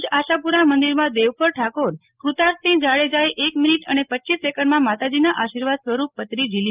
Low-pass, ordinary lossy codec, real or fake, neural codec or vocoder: 3.6 kHz; Opus, 32 kbps; real; none